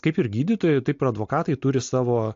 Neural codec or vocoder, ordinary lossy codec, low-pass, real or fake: none; AAC, 64 kbps; 7.2 kHz; real